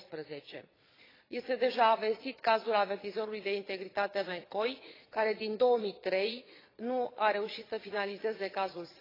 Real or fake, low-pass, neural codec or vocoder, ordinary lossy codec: fake; 5.4 kHz; vocoder, 22.05 kHz, 80 mel bands, Vocos; AAC, 24 kbps